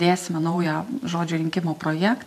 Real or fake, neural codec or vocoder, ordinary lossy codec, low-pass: fake; vocoder, 44.1 kHz, 128 mel bands every 512 samples, BigVGAN v2; MP3, 96 kbps; 14.4 kHz